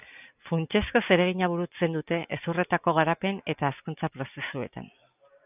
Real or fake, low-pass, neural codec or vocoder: real; 3.6 kHz; none